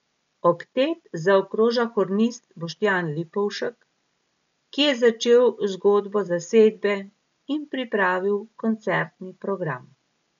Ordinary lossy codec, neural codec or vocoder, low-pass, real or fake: MP3, 64 kbps; none; 7.2 kHz; real